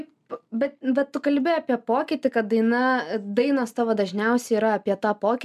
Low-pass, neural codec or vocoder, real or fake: 14.4 kHz; none; real